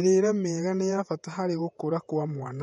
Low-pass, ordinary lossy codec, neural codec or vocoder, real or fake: 19.8 kHz; MP3, 48 kbps; vocoder, 48 kHz, 128 mel bands, Vocos; fake